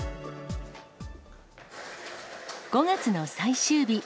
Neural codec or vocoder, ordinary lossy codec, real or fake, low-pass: none; none; real; none